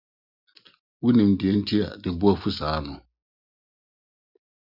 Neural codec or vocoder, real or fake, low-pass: none; real; 5.4 kHz